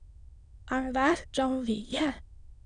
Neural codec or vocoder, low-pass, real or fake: autoencoder, 22.05 kHz, a latent of 192 numbers a frame, VITS, trained on many speakers; 9.9 kHz; fake